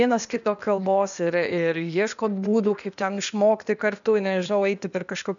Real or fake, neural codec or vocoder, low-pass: fake; codec, 16 kHz, 0.8 kbps, ZipCodec; 7.2 kHz